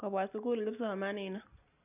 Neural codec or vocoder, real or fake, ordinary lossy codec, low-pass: none; real; none; 3.6 kHz